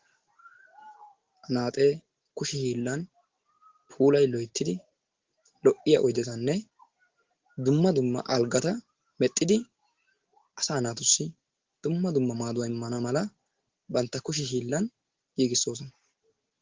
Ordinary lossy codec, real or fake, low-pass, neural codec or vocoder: Opus, 16 kbps; real; 7.2 kHz; none